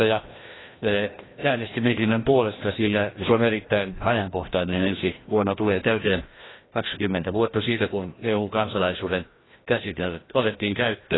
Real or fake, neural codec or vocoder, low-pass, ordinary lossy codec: fake; codec, 16 kHz, 1 kbps, FreqCodec, larger model; 7.2 kHz; AAC, 16 kbps